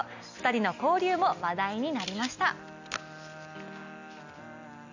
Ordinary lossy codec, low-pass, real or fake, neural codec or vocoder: none; 7.2 kHz; real; none